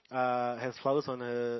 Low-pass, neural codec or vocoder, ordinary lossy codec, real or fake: 7.2 kHz; none; MP3, 24 kbps; real